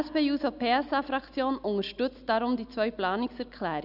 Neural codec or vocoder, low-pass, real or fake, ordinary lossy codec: none; 5.4 kHz; real; none